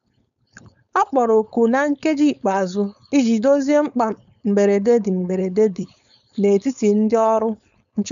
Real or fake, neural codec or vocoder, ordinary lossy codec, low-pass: fake; codec, 16 kHz, 4.8 kbps, FACodec; none; 7.2 kHz